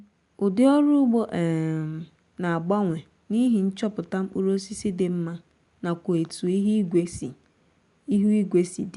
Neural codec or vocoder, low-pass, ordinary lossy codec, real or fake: none; 10.8 kHz; none; real